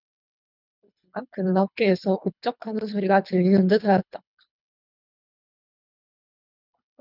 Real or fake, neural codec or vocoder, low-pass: fake; codec, 24 kHz, 3 kbps, HILCodec; 5.4 kHz